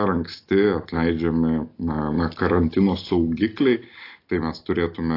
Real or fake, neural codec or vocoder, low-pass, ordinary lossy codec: real; none; 5.4 kHz; AAC, 32 kbps